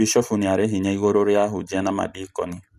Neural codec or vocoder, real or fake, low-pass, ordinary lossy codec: none; real; 14.4 kHz; none